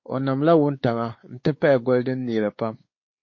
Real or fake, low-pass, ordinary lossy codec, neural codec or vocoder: fake; 7.2 kHz; MP3, 32 kbps; codec, 16 kHz, 4 kbps, X-Codec, WavLM features, trained on Multilingual LibriSpeech